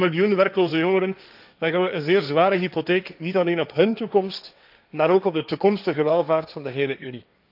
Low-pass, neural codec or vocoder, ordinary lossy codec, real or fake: 5.4 kHz; codec, 16 kHz, 1.1 kbps, Voila-Tokenizer; none; fake